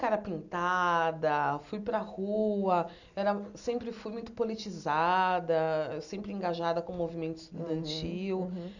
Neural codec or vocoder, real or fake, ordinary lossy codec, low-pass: none; real; none; 7.2 kHz